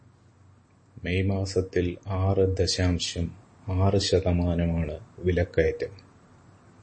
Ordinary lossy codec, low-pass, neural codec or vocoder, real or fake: MP3, 32 kbps; 9.9 kHz; none; real